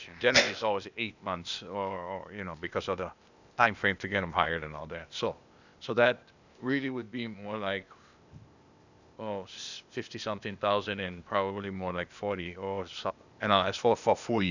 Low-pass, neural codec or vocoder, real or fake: 7.2 kHz; codec, 16 kHz, 0.8 kbps, ZipCodec; fake